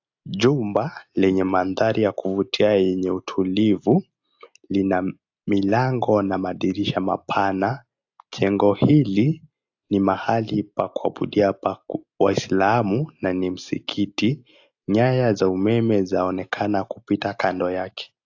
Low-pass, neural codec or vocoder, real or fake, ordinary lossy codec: 7.2 kHz; none; real; AAC, 48 kbps